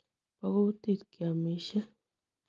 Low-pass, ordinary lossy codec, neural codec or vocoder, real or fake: 7.2 kHz; Opus, 32 kbps; none; real